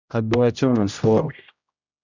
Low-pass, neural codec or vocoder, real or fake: 7.2 kHz; codec, 16 kHz, 0.5 kbps, X-Codec, HuBERT features, trained on general audio; fake